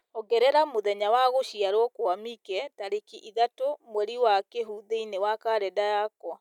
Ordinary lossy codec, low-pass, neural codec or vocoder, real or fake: none; 19.8 kHz; none; real